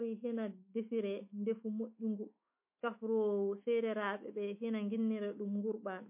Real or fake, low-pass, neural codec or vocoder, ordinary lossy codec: fake; 3.6 kHz; autoencoder, 48 kHz, 128 numbers a frame, DAC-VAE, trained on Japanese speech; MP3, 24 kbps